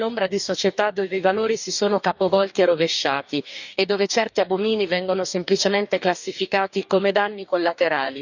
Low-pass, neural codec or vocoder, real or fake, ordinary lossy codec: 7.2 kHz; codec, 44.1 kHz, 2.6 kbps, DAC; fake; none